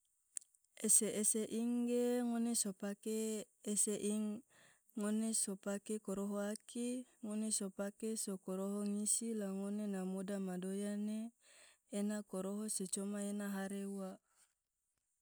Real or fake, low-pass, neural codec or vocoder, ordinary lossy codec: real; none; none; none